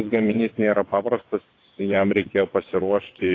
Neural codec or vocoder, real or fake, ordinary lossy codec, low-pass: vocoder, 22.05 kHz, 80 mel bands, WaveNeXt; fake; AAC, 32 kbps; 7.2 kHz